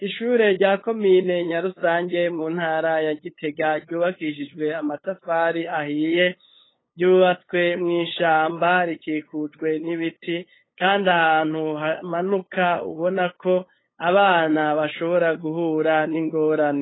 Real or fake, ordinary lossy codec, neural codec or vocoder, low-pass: fake; AAC, 16 kbps; codec, 16 kHz, 8 kbps, FunCodec, trained on LibriTTS, 25 frames a second; 7.2 kHz